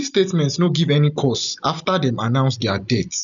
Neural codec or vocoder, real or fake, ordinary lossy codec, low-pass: none; real; none; 7.2 kHz